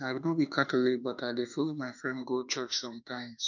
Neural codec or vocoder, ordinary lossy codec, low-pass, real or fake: autoencoder, 48 kHz, 32 numbers a frame, DAC-VAE, trained on Japanese speech; none; 7.2 kHz; fake